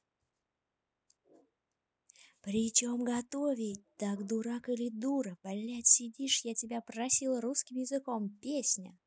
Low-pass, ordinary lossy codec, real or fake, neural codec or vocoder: none; none; real; none